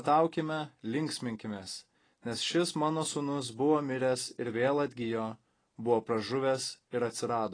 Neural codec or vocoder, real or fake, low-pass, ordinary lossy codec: none; real; 9.9 kHz; AAC, 32 kbps